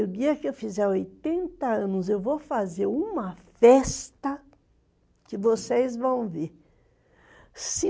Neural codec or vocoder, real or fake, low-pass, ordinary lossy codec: none; real; none; none